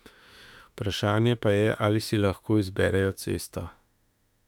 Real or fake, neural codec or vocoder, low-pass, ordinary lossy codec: fake; autoencoder, 48 kHz, 32 numbers a frame, DAC-VAE, trained on Japanese speech; 19.8 kHz; none